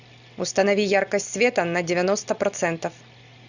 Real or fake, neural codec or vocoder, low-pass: real; none; 7.2 kHz